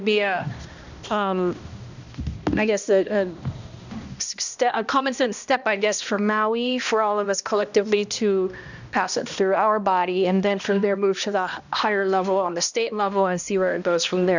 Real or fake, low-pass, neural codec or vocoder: fake; 7.2 kHz; codec, 16 kHz, 1 kbps, X-Codec, HuBERT features, trained on balanced general audio